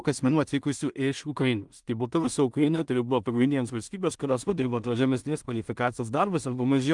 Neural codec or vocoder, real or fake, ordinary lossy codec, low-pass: codec, 16 kHz in and 24 kHz out, 0.4 kbps, LongCat-Audio-Codec, two codebook decoder; fake; Opus, 32 kbps; 10.8 kHz